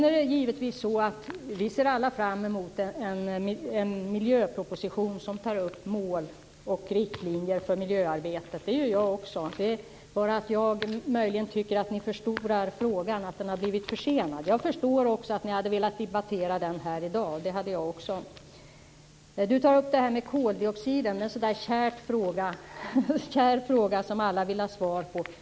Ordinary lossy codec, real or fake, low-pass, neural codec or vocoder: none; real; none; none